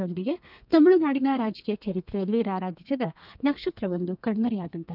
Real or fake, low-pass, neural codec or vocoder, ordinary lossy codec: fake; 5.4 kHz; codec, 32 kHz, 1.9 kbps, SNAC; none